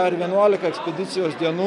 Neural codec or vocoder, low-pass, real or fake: none; 10.8 kHz; real